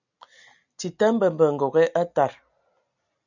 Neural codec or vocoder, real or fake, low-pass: none; real; 7.2 kHz